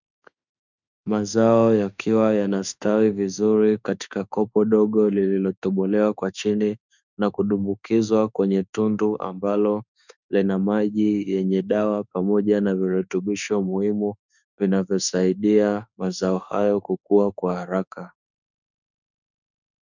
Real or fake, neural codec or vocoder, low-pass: fake; autoencoder, 48 kHz, 32 numbers a frame, DAC-VAE, trained on Japanese speech; 7.2 kHz